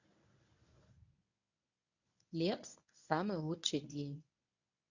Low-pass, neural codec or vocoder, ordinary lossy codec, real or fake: 7.2 kHz; codec, 24 kHz, 0.9 kbps, WavTokenizer, medium speech release version 1; none; fake